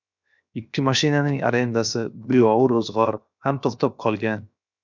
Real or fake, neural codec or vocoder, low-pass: fake; codec, 16 kHz, 0.7 kbps, FocalCodec; 7.2 kHz